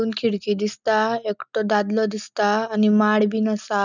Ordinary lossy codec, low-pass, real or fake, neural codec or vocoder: none; 7.2 kHz; real; none